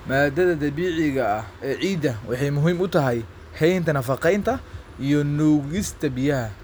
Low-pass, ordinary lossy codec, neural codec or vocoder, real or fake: none; none; none; real